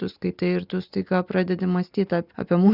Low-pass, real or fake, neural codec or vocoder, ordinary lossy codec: 5.4 kHz; real; none; Opus, 64 kbps